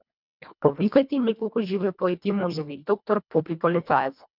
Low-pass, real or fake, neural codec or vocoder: 5.4 kHz; fake; codec, 24 kHz, 1.5 kbps, HILCodec